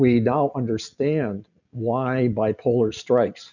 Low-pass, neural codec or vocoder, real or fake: 7.2 kHz; none; real